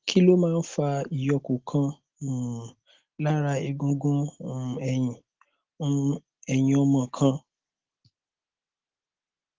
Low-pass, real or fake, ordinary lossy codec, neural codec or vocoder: 7.2 kHz; real; Opus, 16 kbps; none